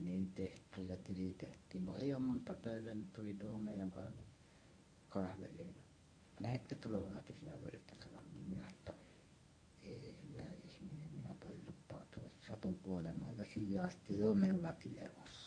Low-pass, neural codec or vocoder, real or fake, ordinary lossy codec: 9.9 kHz; codec, 24 kHz, 0.9 kbps, WavTokenizer, medium speech release version 1; fake; AAC, 48 kbps